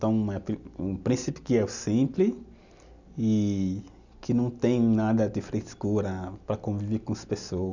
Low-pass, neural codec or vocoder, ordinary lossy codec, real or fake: 7.2 kHz; none; none; real